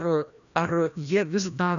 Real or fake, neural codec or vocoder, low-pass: fake; codec, 16 kHz, 1 kbps, FreqCodec, larger model; 7.2 kHz